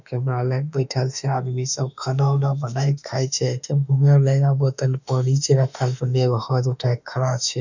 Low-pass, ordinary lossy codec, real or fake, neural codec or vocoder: 7.2 kHz; none; fake; autoencoder, 48 kHz, 32 numbers a frame, DAC-VAE, trained on Japanese speech